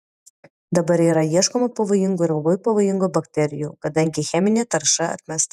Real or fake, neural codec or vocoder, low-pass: fake; vocoder, 48 kHz, 128 mel bands, Vocos; 14.4 kHz